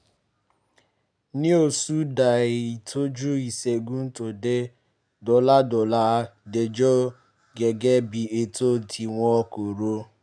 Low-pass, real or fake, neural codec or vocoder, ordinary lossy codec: 9.9 kHz; real; none; none